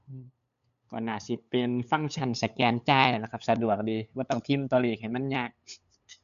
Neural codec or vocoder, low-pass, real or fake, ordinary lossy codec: codec, 16 kHz, 8 kbps, FunCodec, trained on LibriTTS, 25 frames a second; 7.2 kHz; fake; none